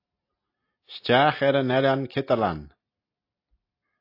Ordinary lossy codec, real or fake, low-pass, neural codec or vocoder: AAC, 32 kbps; real; 5.4 kHz; none